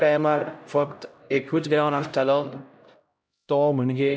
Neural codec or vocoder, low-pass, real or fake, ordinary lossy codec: codec, 16 kHz, 0.5 kbps, X-Codec, HuBERT features, trained on LibriSpeech; none; fake; none